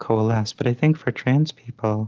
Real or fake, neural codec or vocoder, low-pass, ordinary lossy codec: real; none; 7.2 kHz; Opus, 24 kbps